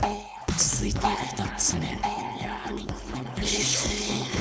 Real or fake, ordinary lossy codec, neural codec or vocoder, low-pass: fake; none; codec, 16 kHz, 4.8 kbps, FACodec; none